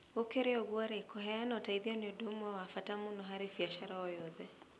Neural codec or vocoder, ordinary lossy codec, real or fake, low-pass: none; none; real; none